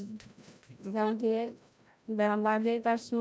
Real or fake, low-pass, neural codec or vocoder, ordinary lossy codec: fake; none; codec, 16 kHz, 0.5 kbps, FreqCodec, larger model; none